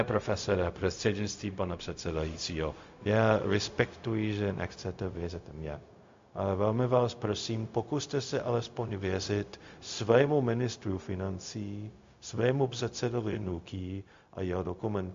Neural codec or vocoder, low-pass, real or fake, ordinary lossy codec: codec, 16 kHz, 0.4 kbps, LongCat-Audio-Codec; 7.2 kHz; fake; MP3, 48 kbps